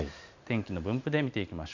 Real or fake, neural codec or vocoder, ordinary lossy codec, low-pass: real; none; none; 7.2 kHz